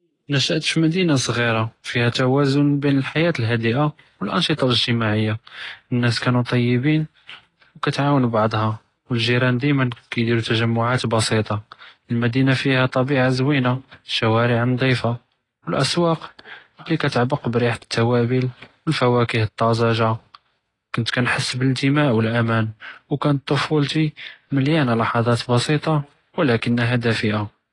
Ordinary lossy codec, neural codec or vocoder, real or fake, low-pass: AAC, 32 kbps; none; real; 10.8 kHz